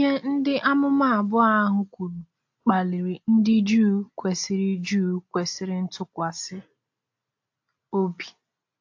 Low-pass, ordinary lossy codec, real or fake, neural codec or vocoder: 7.2 kHz; AAC, 48 kbps; real; none